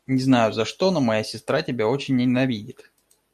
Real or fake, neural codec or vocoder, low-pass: real; none; 14.4 kHz